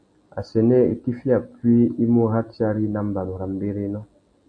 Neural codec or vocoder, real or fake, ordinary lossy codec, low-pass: none; real; Opus, 64 kbps; 9.9 kHz